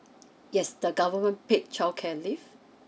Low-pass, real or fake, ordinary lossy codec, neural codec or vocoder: none; real; none; none